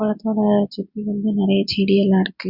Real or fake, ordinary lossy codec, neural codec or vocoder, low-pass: real; Opus, 64 kbps; none; 5.4 kHz